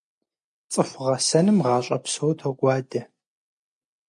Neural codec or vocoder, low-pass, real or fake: none; 10.8 kHz; real